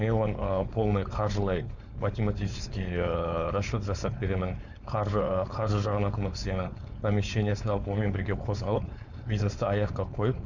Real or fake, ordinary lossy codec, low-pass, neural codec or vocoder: fake; none; 7.2 kHz; codec, 16 kHz, 4.8 kbps, FACodec